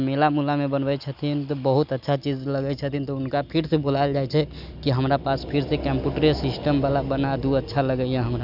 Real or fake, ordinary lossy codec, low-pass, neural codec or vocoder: real; none; 5.4 kHz; none